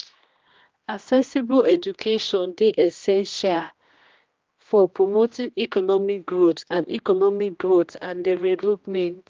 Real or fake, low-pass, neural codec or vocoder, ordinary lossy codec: fake; 7.2 kHz; codec, 16 kHz, 1 kbps, X-Codec, HuBERT features, trained on general audio; Opus, 24 kbps